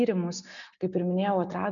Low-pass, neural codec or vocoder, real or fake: 7.2 kHz; none; real